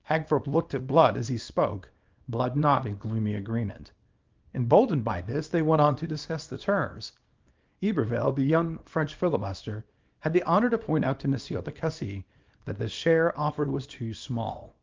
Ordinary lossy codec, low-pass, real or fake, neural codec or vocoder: Opus, 32 kbps; 7.2 kHz; fake; codec, 24 kHz, 0.9 kbps, WavTokenizer, small release